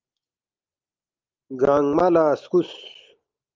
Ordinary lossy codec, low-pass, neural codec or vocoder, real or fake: Opus, 32 kbps; 7.2 kHz; none; real